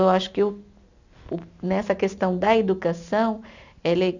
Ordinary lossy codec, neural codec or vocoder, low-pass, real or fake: none; none; 7.2 kHz; real